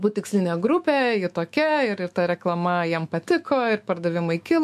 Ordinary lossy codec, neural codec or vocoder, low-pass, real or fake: MP3, 64 kbps; autoencoder, 48 kHz, 128 numbers a frame, DAC-VAE, trained on Japanese speech; 14.4 kHz; fake